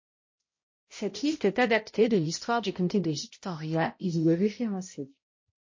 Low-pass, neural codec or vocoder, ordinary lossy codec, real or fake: 7.2 kHz; codec, 16 kHz, 0.5 kbps, X-Codec, HuBERT features, trained on balanced general audio; MP3, 32 kbps; fake